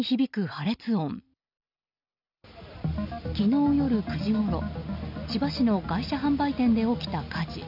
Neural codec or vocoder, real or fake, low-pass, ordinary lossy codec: none; real; 5.4 kHz; none